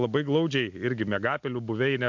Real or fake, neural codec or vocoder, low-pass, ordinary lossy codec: real; none; 7.2 kHz; MP3, 64 kbps